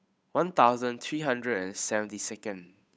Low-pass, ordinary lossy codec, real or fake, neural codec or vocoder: none; none; fake; codec, 16 kHz, 8 kbps, FunCodec, trained on Chinese and English, 25 frames a second